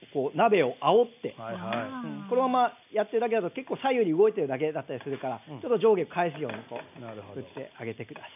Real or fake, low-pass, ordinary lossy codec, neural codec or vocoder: real; 3.6 kHz; none; none